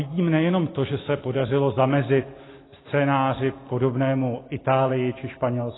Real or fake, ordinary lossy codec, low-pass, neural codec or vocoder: real; AAC, 16 kbps; 7.2 kHz; none